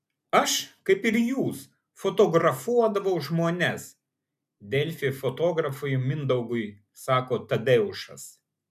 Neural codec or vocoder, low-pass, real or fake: none; 14.4 kHz; real